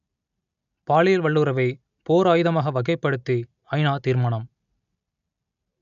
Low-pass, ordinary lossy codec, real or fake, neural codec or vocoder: 7.2 kHz; none; real; none